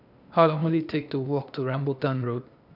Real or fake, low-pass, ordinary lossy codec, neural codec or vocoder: fake; 5.4 kHz; none; codec, 16 kHz, 0.8 kbps, ZipCodec